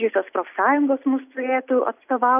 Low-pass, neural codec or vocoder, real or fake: 3.6 kHz; none; real